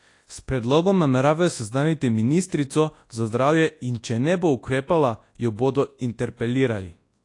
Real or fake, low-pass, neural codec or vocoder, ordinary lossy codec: fake; 10.8 kHz; codec, 24 kHz, 0.9 kbps, WavTokenizer, large speech release; AAC, 48 kbps